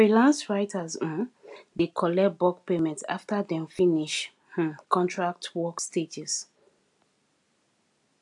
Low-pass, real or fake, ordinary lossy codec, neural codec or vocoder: 10.8 kHz; real; AAC, 64 kbps; none